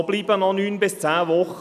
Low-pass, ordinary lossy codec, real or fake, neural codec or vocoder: 14.4 kHz; none; real; none